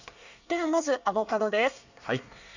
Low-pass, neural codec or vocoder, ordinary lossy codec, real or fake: 7.2 kHz; codec, 24 kHz, 1 kbps, SNAC; none; fake